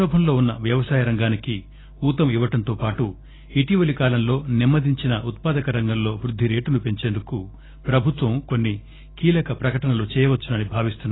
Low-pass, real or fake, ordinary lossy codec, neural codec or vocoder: 7.2 kHz; real; AAC, 16 kbps; none